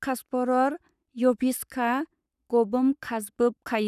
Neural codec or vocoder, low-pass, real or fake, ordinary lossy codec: codec, 44.1 kHz, 7.8 kbps, Pupu-Codec; 14.4 kHz; fake; none